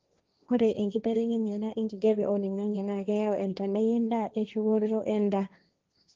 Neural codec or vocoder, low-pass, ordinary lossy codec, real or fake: codec, 16 kHz, 1.1 kbps, Voila-Tokenizer; 7.2 kHz; Opus, 32 kbps; fake